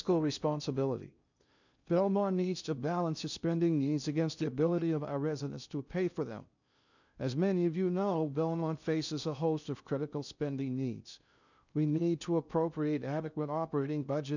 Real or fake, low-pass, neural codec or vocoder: fake; 7.2 kHz; codec, 16 kHz in and 24 kHz out, 0.6 kbps, FocalCodec, streaming, 2048 codes